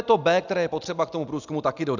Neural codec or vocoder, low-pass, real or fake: none; 7.2 kHz; real